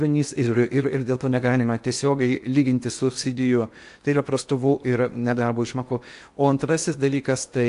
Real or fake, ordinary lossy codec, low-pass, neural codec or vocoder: fake; AAC, 64 kbps; 10.8 kHz; codec, 16 kHz in and 24 kHz out, 0.8 kbps, FocalCodec, streaming, 65536 codes